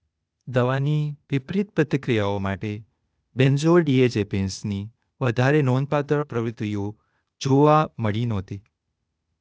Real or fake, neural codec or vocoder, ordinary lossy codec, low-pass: fake; codec, 16 kHz, 0.8 kbps, ZipCodec; none; none